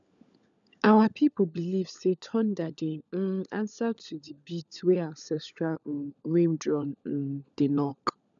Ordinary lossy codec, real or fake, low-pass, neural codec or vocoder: none; fake; 7.2 kHz; codec, 16 kHz, 16 kbps, FunCodec, trained on LibriTTS, 50 frames a second